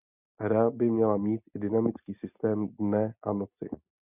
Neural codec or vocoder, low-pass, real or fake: none; 3.6 kHz; real